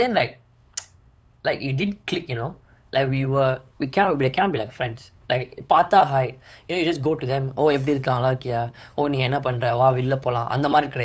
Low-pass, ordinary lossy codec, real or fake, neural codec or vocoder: none; none; fake; codec, 16 kHz, 8 kbps, FunCodec, trained on LibriTTS, 25 frames a second